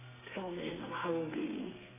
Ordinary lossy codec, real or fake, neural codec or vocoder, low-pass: AAC, 16 kbps; fake; codec, 32 kHz, 1.9 kbps, SNAC; 3.6 kHz